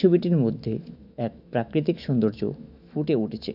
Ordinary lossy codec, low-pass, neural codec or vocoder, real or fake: none; 5.4 kHz; autoencoder, 48 kHz, 128 numbers a frame, DAC-VAE, trained on Japanese speech; fake